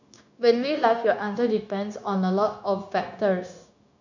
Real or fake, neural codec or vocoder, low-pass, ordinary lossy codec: fake; codec, 16 kHz, 0.9 kbps, LongCat-Audio-Codec; 7.2 kHz; none